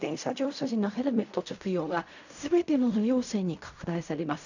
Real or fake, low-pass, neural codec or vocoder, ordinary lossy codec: fake; 7.2 kHz; codec, 16 kHz in and 24 kHz out, 0.4 kbps, LongCat-Audio-Codec, fine tuned four codebook decoder; none